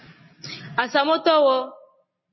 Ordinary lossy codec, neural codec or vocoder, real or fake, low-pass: MP3, 24 kbps; none; real; 7.2 kHz